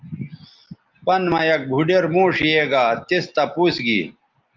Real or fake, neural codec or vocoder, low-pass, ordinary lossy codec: real; none; 7.2 kHz; Opus, 32 kbps